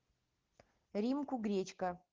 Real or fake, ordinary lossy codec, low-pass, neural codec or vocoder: real; Opus, 16 kbps; 7.2 kHz; none